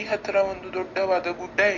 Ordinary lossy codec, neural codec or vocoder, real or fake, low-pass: MP3, 48 kbps; none; real; 7.2 kHz